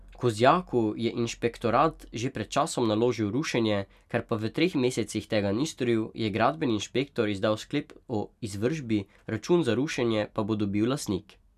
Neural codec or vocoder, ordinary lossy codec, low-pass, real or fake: none; none; 14.4 kHz; real